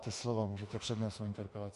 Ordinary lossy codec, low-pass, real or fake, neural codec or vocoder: MP3, 48 kbps; 14.4 kHz; fake; autoencoder, 48 kHz, 32 numbers a frame, DAC-VAE, trained on Japanese speech